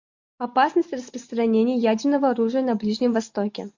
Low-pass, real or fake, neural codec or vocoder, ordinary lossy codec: 7.2 kHz; real; none; MP3, 48 kbps